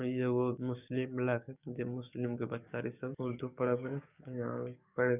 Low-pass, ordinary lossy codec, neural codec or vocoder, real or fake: 3.6 kHz; none; codec, 44.1 kHz, 7.8 kbps, DAC; fake